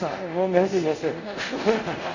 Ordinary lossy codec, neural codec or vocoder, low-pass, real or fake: none; codec, 24 kHz, 0.5 kbps, DualCodec; 7.2 kHz; fake